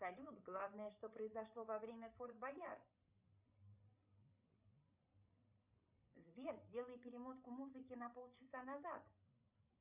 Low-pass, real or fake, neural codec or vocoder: 3.6 kHz; fake; codec, 16 kHz, 8 kbps, FreqCodec, larger model